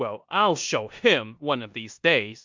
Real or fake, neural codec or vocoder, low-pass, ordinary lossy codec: fake; codec, 16 kHz in and 24 kHz out, 0.9 kbps, LongCat-Audio-Codec, four codebook decoder; 7.2 kHz; MP3, 64 kbps